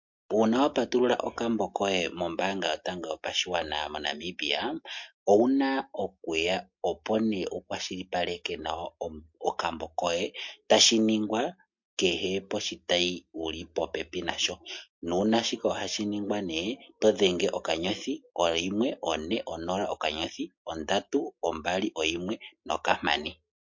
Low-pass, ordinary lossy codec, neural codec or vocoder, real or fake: 7.2 kHz; MP3, 48 kbps; none; real